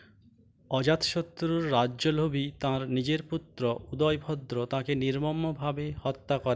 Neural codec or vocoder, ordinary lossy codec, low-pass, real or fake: none; none; none; real